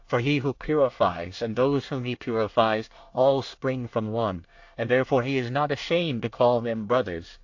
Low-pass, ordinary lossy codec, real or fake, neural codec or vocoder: 7.2 kHz; AAC, 48 kbps; fake; codec, 24 kHz, 1 kbps, SNAC